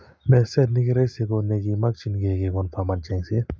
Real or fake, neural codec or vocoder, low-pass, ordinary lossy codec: real; none; none; none